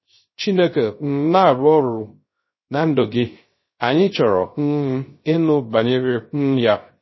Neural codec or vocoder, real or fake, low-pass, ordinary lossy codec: codec, 16 kHz, 0.3 kbps, FocalCodec; fake; 7.2 kHz; MP3, 24 kbps